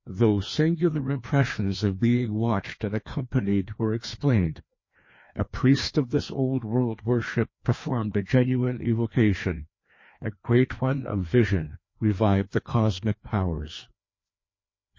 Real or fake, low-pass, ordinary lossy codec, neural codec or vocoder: fake; 7.2 kHz; MP3, 32 kbps; codec, 16 kHz, 2 kbps, FreqCodec, larger model